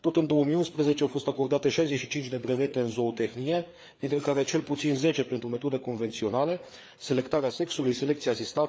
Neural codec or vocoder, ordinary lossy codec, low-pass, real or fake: codec, 16 kHz, 4 kbps, FreqCodec, larger model; none; none; fake